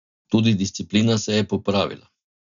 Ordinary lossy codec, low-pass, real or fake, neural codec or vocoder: none; 7.2 kHz; real; none